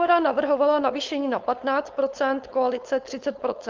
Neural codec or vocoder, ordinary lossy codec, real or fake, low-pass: codec, 16 kHz, 4.8 kbps, FACodec; Opus, 32 kbps; fake; 7.2 kHz